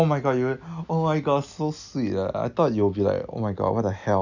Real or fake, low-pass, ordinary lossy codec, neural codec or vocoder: real; 7.2 kHz; none; none